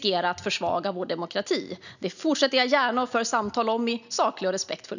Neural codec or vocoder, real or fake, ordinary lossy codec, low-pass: none; real; none; 7.2 kHz